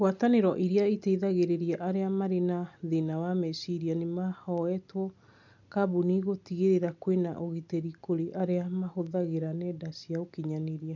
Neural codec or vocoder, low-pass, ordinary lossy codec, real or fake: none; 7.2 kHz; none; real